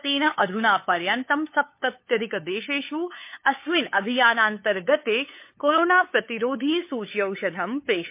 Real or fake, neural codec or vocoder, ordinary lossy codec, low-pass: fake; codec, 16 kHz, 8 kbps, FunCodec, trained on LibriTTS, 25 frames a second; MP3, 24 kbps; 3.6 kHz